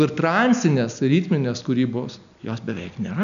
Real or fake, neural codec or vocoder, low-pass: real; none; 7.2 kHz